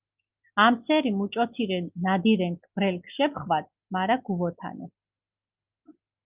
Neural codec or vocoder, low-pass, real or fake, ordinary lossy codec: none; 3.6 kHz; real; Opus, 24 kbps